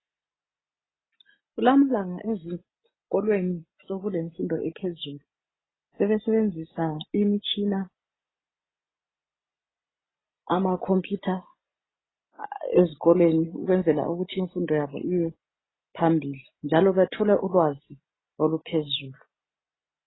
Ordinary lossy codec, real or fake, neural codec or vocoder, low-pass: AAC, 16 kbps; real; none; 7.2 kHz